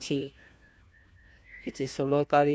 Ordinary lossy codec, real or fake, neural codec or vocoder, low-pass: none; fake; codec, 16 kHz, 1 kbps, FunCodec, trained on LibriTTS, 50 frames a second; none